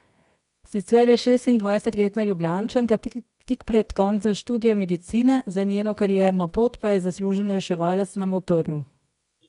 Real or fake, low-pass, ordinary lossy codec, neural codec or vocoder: fake; 10.8 kHz; none; codec, 24 kHz, 0.9 kbps, WavTokenizer, medium music audio release